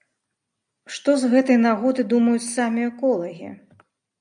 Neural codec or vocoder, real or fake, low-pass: none; real; 9.9 kHz